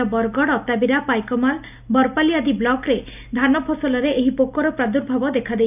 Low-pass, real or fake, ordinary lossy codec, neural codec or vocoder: 3.6 kHz; real; none; none